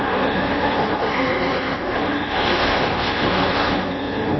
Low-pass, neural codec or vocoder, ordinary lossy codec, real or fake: 7.2 kHz; codec, 24 kHz, 1.2 kbps, DualCodec; MP3, 24 kbps; fake